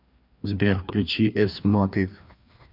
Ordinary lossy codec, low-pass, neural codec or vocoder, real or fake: MP3, 48 kbps; 5.4 kHz; codec, 16 kHz, 2 kbps, FreqCodec, larger model; fake